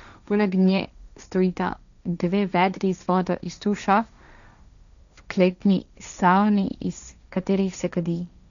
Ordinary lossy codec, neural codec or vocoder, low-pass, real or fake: none; codec, 16 kHz, 1.1 kbps, Voila-Tokenizer; 7.2 kHz; fake